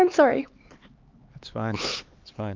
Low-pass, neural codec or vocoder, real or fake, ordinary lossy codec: 7.2 kHz; codec, 16 kHz, 4 kbps, X-Codec, HuBERT features, trained on LibriSpeech; fake; Opus, 24 kbps